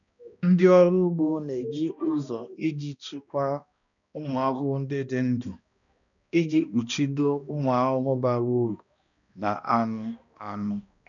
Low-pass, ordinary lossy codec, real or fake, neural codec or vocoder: 7.2 kHz; none; fake; codec, 16 kHz, 1 kbps, X-Codec, HuBERT features, trained on balanced general audio